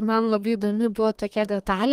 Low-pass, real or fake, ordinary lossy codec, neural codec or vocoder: 14.4 kHz; fake; Opus, 32 kbps; codec, 32 kHz, 1.9 kbps, SNAC